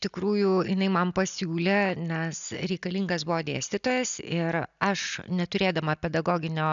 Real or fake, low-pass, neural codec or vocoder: real; 7.2 kHz; none